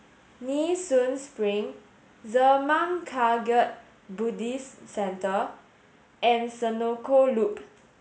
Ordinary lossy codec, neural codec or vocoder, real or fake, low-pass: none; none; real; none